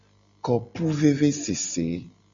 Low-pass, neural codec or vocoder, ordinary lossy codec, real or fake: 7.2 kHz; none; Opus, 64 kbps; real